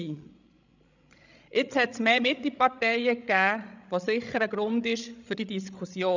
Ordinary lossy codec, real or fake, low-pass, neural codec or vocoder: none; fake; 7.2 kHz; codec, 16 kHz, 16 kbps, FreqCodec, larger model